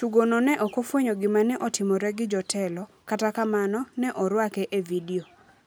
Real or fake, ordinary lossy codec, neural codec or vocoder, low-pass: real; none; none; none